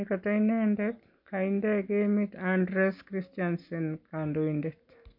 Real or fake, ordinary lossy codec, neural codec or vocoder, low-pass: real; MP3, 48 kbps; none; 5.4 kHz